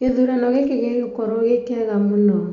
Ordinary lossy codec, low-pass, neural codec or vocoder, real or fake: none; 7.2 kHz; none; real